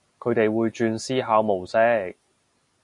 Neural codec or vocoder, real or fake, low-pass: none; real; 10.8 kHz